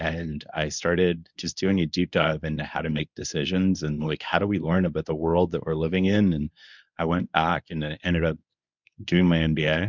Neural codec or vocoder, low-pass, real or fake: codec, 24 kHz, 0.9 kbps, WavTokenizer, medium speech release version 1; 7.2 kHz; fake